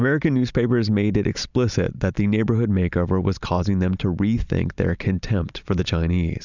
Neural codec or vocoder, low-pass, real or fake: none; 7.2 kHz; real